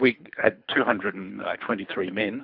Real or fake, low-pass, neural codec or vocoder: fake; 5.4 kHz; codec, 24 kHz, 3 kbps, HILCodec